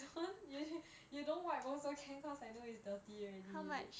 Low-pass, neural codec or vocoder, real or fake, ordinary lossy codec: none; none; real; none